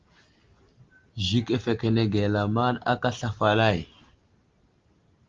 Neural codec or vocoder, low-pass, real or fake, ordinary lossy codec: none; 7.2 kHz; real; Opus, 32 kbps